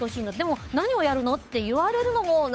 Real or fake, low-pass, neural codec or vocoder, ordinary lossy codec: fake; none; codec, 16 kHz, 8 kbps, FunCodec, trained on Chinese and English, 25 frames a second; none